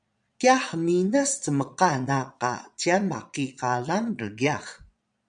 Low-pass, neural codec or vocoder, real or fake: 9.9 kHz; vocoder, 22.05 kHz, 80 mel bands, Vocos; fake